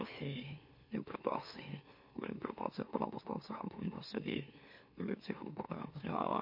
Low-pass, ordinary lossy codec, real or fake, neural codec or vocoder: 5.4 kHz; MP3, 32 kbps; fake; autoencoder, 44.1 kHz, a latent of 192 numbers a frame, MeloTTS